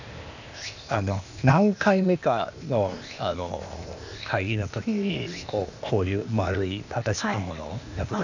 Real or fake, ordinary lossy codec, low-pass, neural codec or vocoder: fake; none; 7.2 kHz; codec, 16 kHz, 0.8 kbps, ZipCodec